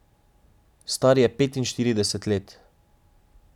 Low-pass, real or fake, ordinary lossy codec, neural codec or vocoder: 19.8 kHz; real; none; none